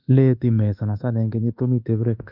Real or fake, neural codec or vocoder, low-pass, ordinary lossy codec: fake; codec, 24 kHz, 1.2 kbps, DualCodec; 5.4 kHz; Opus, 32 kbps